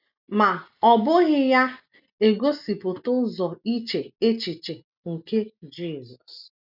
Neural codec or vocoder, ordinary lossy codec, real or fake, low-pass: none; none; real; 5.4 kHz